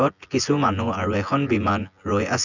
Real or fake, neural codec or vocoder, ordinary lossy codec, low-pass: fake; vocoder, 24 kHz, 100 mel bands, Vocos; none; 7.2 kHz